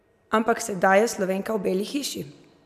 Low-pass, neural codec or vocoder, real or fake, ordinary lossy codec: 14.4 kHz; none; real; none